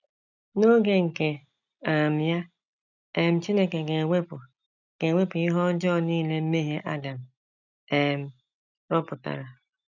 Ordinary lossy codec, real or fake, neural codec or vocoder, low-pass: none; real; none; 7.2 kHz